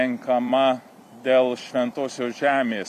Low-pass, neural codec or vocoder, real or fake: 14.4 kHz; vocoder, 44.1 kHz, 128 mel bands every 256 samples, BigVGAN v2; fake